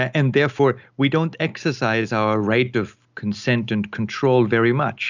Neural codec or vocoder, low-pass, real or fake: none; 7.2 kHz; real